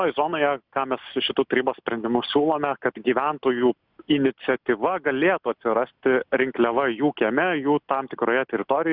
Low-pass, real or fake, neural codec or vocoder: 5.4 kHz; real; none